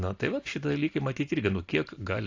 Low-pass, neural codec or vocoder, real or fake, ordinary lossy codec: 7.2 kHz; none; real; AAC, 48 kbps